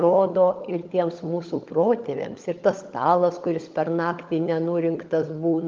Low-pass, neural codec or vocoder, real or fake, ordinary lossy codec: 7.2 kHz; codec, 16 kHz, 16 kbps, FunCodec, trained on LibriTTS, 50 frames a second; fake; Opus, 24 kbps